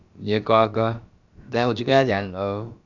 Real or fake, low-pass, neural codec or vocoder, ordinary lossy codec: fake; 7.2 kHz; codec, 16 kHz, about 1 kbps, DyCAST, with the encoder's durations; Opus, 64 kbps